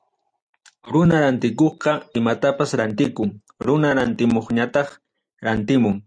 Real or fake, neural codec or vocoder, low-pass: real; none; 9.9 kHz